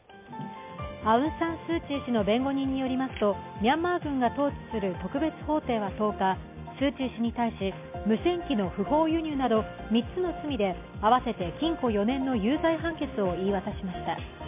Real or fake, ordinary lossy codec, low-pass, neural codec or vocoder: real; MP3, 32 kbps; 3.6 kHz; none